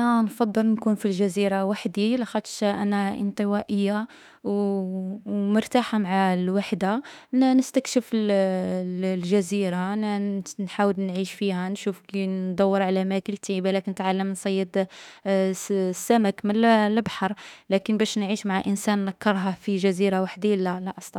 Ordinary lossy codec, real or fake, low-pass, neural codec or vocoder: none; fake; 19.8 kHz; autoencoder, 48 kHz, 32 numbers a frame, DAC-VAE, trained on Japanese speech